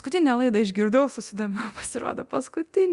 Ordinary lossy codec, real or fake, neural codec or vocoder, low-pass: Opus, 64 kbps; fake; codec, 24 kHz, 0.9 kbps, DualCodec; 10.8 kHz